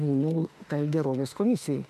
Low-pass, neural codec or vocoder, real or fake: 14.4 kHz; autoencoder, 48 kHz, 32 numbers a frame, DAC-VAE, trained on Japanese speech; fake